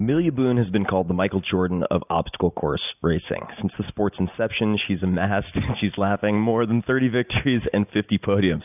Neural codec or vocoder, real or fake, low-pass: none; real; 3.6 kHz